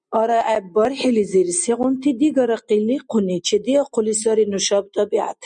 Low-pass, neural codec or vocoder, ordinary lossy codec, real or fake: 9.9 kHz; none; MP3, 96 kbps; real